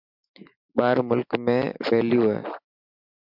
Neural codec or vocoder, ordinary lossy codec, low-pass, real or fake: none; MP3, 48 kbps; 5.4 kHz; real